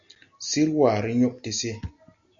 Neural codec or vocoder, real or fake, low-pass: none; real; 7.2 kHz